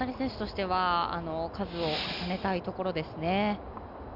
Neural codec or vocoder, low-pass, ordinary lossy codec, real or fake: none; 5.4 kHz; none; real